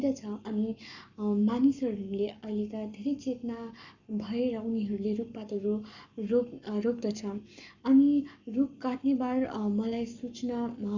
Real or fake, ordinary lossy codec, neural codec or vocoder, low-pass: fake; none; codec, 44.1 kHz, 7.8 kbps, Pupu-Codec; 7.2 kHz